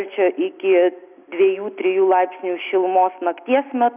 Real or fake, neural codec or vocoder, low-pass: real; none; 3.6 kHz